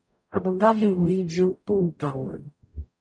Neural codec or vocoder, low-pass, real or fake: codec, 44.1 kHz, 0.9 kbps, DAC; 9.9 kHz; fake